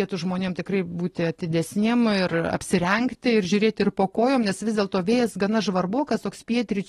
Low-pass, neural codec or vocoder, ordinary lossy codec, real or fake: 19.8 kHz; none; AAC, 32 kbps; real